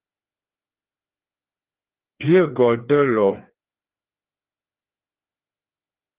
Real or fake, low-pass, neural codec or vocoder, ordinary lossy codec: fake; 3.6 kHz; codec, 16 kHz, 2 kbps, FreqCodec, larger model; Opus, 16 kbps